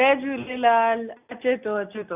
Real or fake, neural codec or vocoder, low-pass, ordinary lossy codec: real; none; 3.6 kHz; none